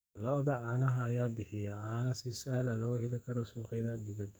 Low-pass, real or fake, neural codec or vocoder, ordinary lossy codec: none; fake; codec, 44.1 kHz, 2.6 kbps, SNAC; none